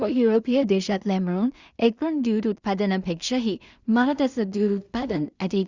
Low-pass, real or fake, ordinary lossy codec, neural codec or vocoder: 7.2 kHz; fake; Opus, 64 kbps; codec, 16 kHz in and 24 kHz out, 0.4 kbps, LongCat-Audio-Codec, two codebook decoder